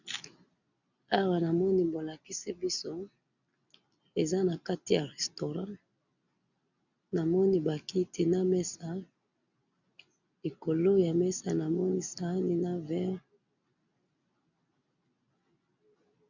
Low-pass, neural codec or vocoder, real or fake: 7.2 kHz; none; real